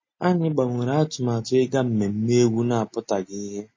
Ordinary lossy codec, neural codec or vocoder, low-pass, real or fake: MP3, 32 kbps; none; 7.2 kHz; real